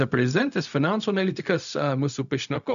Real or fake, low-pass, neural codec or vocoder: fake; 7.2 kHz; codec, 16 kHz, 0.4 kbps, LongCat-Audio-Codec